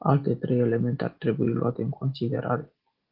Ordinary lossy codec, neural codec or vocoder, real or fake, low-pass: Opus, 16 kbps; none; real; 5.4 kHz